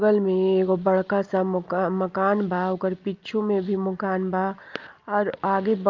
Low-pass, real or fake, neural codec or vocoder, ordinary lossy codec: 7.2 kHz; real; none; Opus, 24 kbps